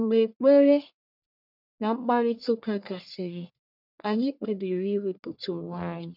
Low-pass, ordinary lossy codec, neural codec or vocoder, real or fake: 5.4 kHz; none; codec, 44.1 kHz, 1.7 kbps, Pupu-Codec; fake